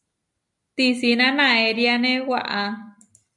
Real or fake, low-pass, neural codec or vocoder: real; 10.8 kHz; none